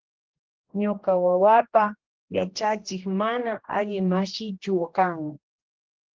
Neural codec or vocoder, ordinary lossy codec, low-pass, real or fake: codec, 16 kHz, 1 kbps, X-Codec, HuBERT features, trained on general audio; Opus, 16 kbps; 7.2 kHz; fake